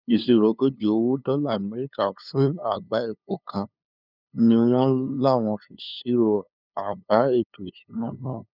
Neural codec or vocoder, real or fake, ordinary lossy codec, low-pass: codec, 16 kHz, 2 kbps, FunCodec, trained on LibriTTS, 25 frames a second; fake; none; 5.4 kHz